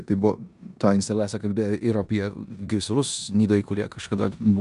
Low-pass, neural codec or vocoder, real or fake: 10.8 kHz; codec, 16 kHz in and 24 kHz out, 0.9 kbps, LongCat-Audio-Codec, four codebook decoder; fake